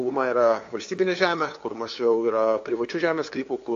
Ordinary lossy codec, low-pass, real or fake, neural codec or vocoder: AAC, 48 kbps; 7.2 kHz; fake; codec, 16 kHz, 2 kbps, FunCodec, trained on LibriTTS, 25 frames a second